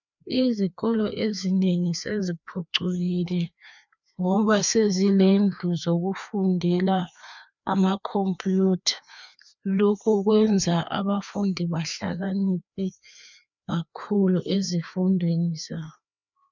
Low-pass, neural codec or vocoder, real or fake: 7.2 kHz; codec, 16 kHz, 2 kbps, FreqCodec, larger model; fake